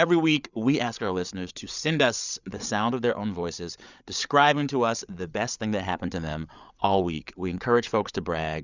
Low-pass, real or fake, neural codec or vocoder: 7.2 kHz; fake; codec, 16 kHz, 8 kbps, FreqCodec, larger model